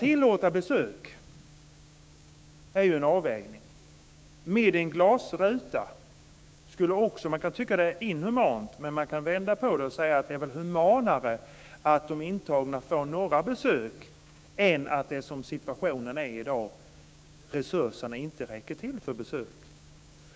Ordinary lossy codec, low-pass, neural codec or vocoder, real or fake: none; none; codec, 16 kHz, 6 kbps, DAC; fake